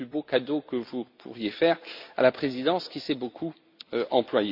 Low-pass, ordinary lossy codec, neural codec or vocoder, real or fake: 5.4 kHz; MP3, 48 kbps; none; real